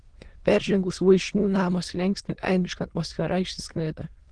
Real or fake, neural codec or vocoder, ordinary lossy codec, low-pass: fake; autoencoder, 22.05 kHz, a latent of 192 numbers a frame, VITS, trained on many speakers; Opus, 16 kbps; 9.9 kHz